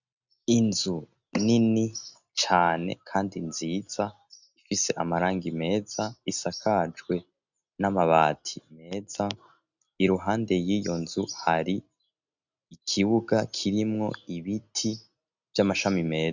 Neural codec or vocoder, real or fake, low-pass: none; real; 7.2 kHz